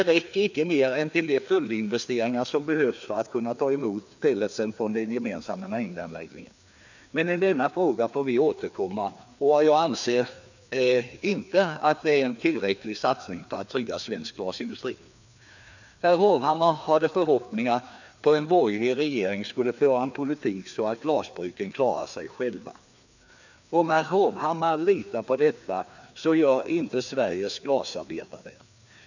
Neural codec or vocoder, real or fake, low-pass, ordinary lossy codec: codec, 16 kHz, 2 kbps, FreqCodec, larger model; fake; 7.2 kHz; none